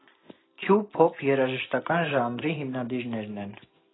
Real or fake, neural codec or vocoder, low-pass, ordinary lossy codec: real; none; 7.2 kHz; AAC, 16 kbps